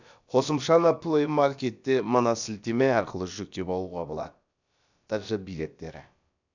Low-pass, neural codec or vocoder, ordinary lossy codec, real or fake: 7.2 kHz; codec, 16 kHz, about 1 kbps, DyCAST, with the encoder's durations; none; fake